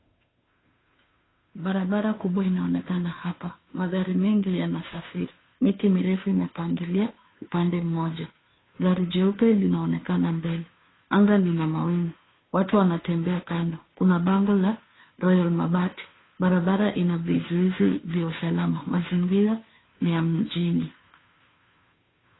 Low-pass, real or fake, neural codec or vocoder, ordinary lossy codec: 7.2 kHz; fake; codec, 16 kHz, 2 kbps, FunCodec, trained on Chinese and English, 25 frames a second; AAC, 16 kbps